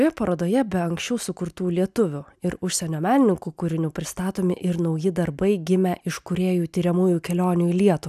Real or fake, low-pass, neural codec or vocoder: real; 14.4 kHz; none